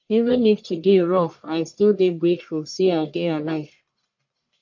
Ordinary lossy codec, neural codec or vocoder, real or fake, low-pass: MP3, 48 kbps; codec, 44.1 kHz, 1.7 kbps, Pupu-Codec; fake; 7.2 kHz